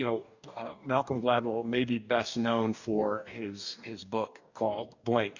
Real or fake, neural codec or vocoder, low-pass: fake; codec, 44.1 kHz, 2.6 kbps, DAC; 7.2 kHz